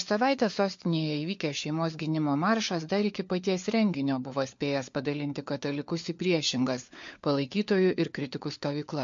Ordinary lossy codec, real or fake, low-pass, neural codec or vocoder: MP3, 48 kbps; fake; 7.2 kHz; codec, 16 kHz, 4 kbps, FunCodec, trained on LibriTTS, 50 frames a second